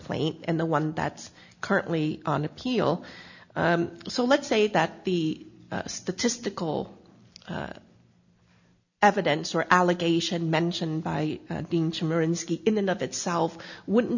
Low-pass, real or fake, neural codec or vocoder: 7.2 kHz; real; none